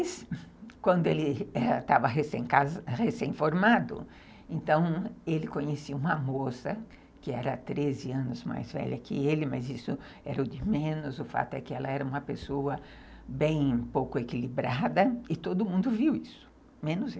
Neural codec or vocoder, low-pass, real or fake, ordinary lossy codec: none; none; real; none